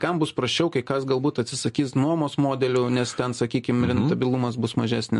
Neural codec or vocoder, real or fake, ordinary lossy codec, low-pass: none; real; MP3, 48 kbps; 10.8 kHz